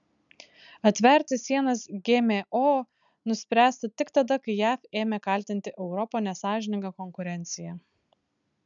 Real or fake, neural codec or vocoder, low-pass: real; none; 7.2 kHz